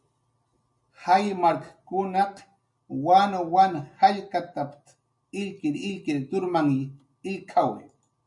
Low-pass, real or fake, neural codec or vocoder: 10.8 kHz; real; none